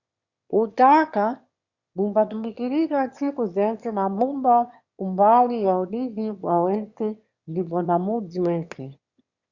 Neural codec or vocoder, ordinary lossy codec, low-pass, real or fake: autoencoder, 22.05 kHz, a latent of 192 numbers a frame, VITS, trained on one speaker; Opus, 64 kbps; 7.2 kHz; fake